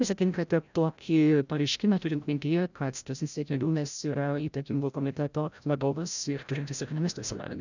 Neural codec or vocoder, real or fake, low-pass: codec, 16 kHz, 0.5 kbps, FreqCodec, larger model; fake; 7.2 kHz